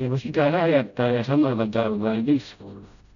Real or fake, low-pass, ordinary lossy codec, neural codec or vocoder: fake; 7.2 kHz; MP3, 96 kbps; codec, 16 kHz, 0.5 kbps, FreqCodec, smaller model